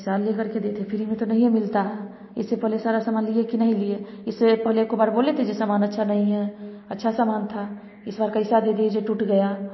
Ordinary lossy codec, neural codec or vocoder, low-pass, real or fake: MP3, 24 kbps; none; 7.2 kHz; real